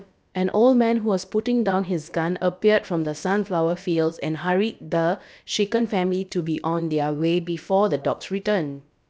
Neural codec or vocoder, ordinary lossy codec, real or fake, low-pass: codec, 16 kHz, about 1 kbps, DyCAST, with the encoder's durations; none; fake; none